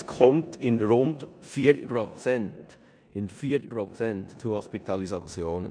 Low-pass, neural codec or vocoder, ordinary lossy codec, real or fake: 9.9 kHz; codec, 16 kHz in and 24 kHz out, 0.9 kbps, LongCat-Audio-Codec, four codebook decoder; none; fake